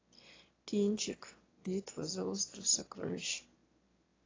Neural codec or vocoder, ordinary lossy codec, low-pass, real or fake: autoencoder, 22.05 kHz, a latent of 192 numbers a frame, VITS, trained on one speaker; AAC, 32 kbps; 7.2 kHz; fake